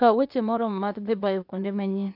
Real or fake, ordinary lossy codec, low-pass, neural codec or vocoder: fake; none; 5.4 kHz; codec, 16 kHz, 0.8 kbps, ZipCodec